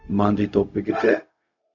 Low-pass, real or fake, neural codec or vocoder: 7.2 kHz; fake; codec, 16 kHz, 0.4 kbps, LongCat-Audio-Codec